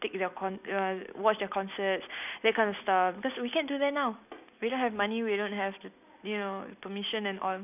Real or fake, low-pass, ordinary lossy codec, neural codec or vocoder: real; 3.6 kHz; none; none